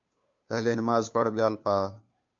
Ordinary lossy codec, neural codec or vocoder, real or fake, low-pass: MP3, 48 kbps; codec, 16 kHz, 2 kbps, FunCodec, trained on Chinese and English, 25 frames a second; fake; 7.2 kHz